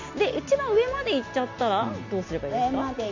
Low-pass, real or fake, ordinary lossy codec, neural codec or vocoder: 7.2 kHz; real; MP3, 48 kbps; none